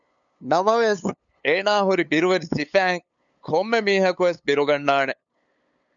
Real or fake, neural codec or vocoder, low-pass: fake; codec, 16 kHz, 8 kbps, FunCodec, trained on LibriTTS, 25 frames a second; 7.2 kHz